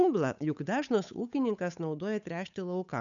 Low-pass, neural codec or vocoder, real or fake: 7.2 kHz; codec, 16 kHz, 8 kbps, FunCodec, trained on Chinese and English, 25 frames a second; fake